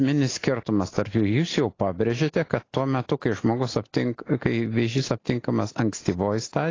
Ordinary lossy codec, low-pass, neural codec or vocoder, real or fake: AAC, 32 kbps; 7.2 kHz; none; real